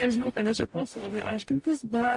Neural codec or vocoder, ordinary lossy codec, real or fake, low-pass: codec, 44.1 kHz, 0.9 kbps, DAC; MP3, 48 kbps; fake; 10.8 kHz